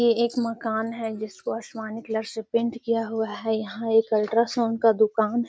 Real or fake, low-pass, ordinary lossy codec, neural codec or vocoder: real; none; none; none